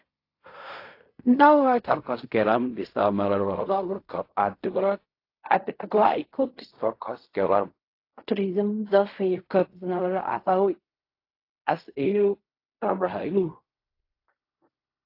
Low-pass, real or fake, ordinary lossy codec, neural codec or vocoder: 5.4 kHz; fake; AAC, 32 kbps; codec, 16 kHz in and 24 kHz out, 0.4 kbps, LongCat-Audio-Codec, fine tuned four codebook decoder